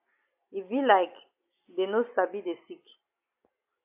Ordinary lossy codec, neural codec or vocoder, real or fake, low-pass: AAC, 32 kbps; none; real; 3.6 kHz